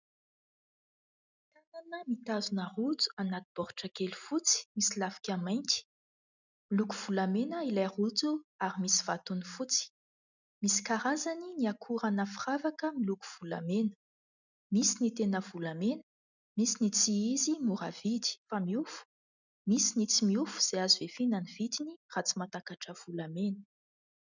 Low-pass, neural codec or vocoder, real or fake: 7.2 kHz; none; real